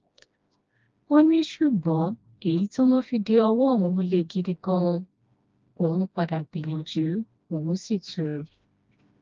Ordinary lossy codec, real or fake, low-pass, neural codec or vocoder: Opus, 24 kbps; fake; 7.2 kHz; codec, 16 kHz, 1 kbps, FreqCodec, smaller model